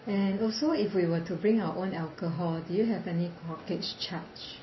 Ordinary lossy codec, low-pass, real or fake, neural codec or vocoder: MP3, 24 kbps; 7.2 kHz; real; none